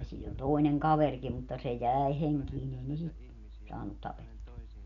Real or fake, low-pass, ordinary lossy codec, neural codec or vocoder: real; 7.2 kHz; none; none